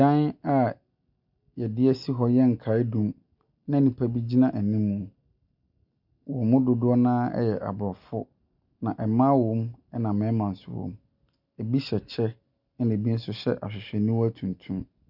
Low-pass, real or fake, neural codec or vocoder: 5.4 kHz; real; none